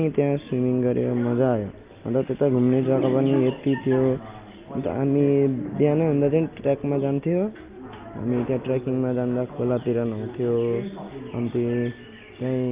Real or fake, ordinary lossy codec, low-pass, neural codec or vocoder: real; Opus, 24 kbps; 3.6 kHz; none